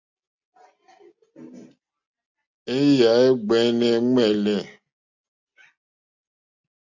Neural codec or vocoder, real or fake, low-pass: none; real; 7.2 kHz